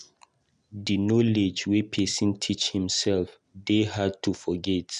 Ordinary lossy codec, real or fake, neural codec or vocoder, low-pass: none; real; none; 10.8 kHz